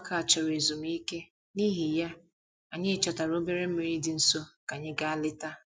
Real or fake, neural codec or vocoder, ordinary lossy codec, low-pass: real; none; none; none